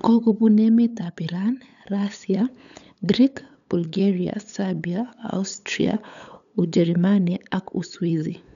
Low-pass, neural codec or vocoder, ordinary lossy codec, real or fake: 7.2 kHz; codec, 16 kHz, 8 kbps, FunCodec, trained on LibriTTS, 25 frames a second; none; fake